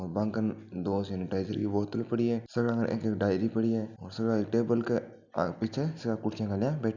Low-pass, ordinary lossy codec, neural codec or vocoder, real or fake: 7.2 kHz; none; none; real